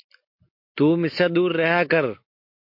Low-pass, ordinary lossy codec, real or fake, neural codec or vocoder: 5.4 kHz; MP3, 32 kbps; real; none